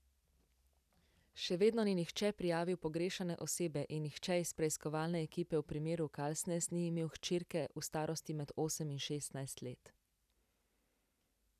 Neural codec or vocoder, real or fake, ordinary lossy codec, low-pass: none; real; none; 14.4 kHz